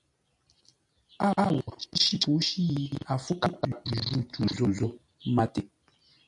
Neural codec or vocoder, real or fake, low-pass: none; real; 10.8 kHz